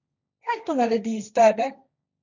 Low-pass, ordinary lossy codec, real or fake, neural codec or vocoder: 7.2 kHz; none; fake; codec, 16 kHz, 1.1 kbps, Voila-Tokenizer